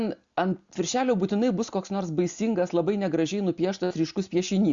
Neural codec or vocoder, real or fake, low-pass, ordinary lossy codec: none; real; 7.2 kHz; Opus, 64 kbps